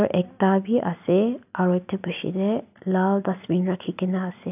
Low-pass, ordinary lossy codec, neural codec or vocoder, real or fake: 3.6 kHz; none; none; real